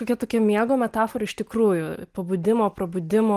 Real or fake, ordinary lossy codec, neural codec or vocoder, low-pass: real; Opus, 24 kbps; none; 14.4 kHz